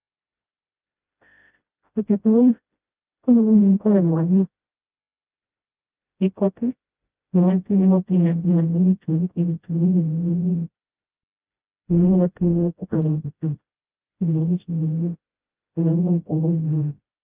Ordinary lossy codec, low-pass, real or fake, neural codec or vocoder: Opus, 32 kbps; 3.6 kHz; fake; codec, 16 kHz, 0.5 kbps, FreqCodec, smaller model